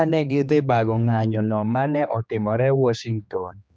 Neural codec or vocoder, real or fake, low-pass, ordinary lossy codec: codec, 16 kHz, 2 kbps, X-Codec, HuBERT features, trained on general audio; fake; none; none